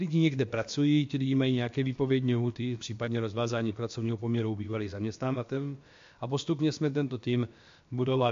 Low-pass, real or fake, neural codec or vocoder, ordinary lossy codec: 7.2 kHz; fake; codec, 16 kHz, about 1 kbps, DyCAST, with the encoder's durations; MP3, 48 kbps